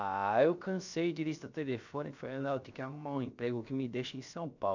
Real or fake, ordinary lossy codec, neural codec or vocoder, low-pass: fake; none; codec, 16 kHz, about 1 kbps, DyCAST, with the encoder's durations; 7.2 kHz